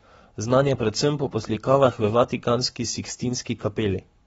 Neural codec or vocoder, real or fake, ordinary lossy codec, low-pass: codec, 44.1 kHz, 7.8 kbps, Pupu-Codec; fake; AAC, 24 kbps; 19.8 kHz